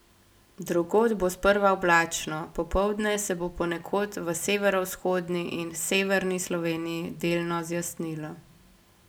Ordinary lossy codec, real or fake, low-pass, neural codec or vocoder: none; real; none; none